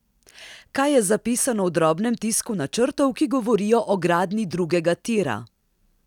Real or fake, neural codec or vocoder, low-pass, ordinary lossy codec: real; none; 19.8 kHz; none